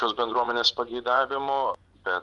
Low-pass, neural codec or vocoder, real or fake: 10.8 kHz; vocoder, 24 kHz, 100 mel bands, Vocos; fake